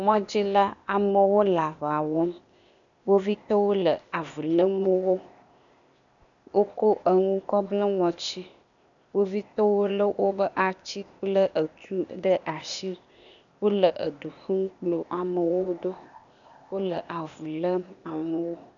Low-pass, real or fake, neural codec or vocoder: 7.2 kHz; fake; codec, 16 kHz, 0.8 kbps, ZipCodec